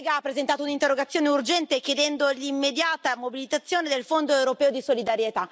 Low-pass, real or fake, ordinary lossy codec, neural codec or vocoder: none; real; none; none